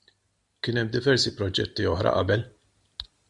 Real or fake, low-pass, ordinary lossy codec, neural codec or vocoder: real; 10.8 kHz; MP3, 96 kbps; none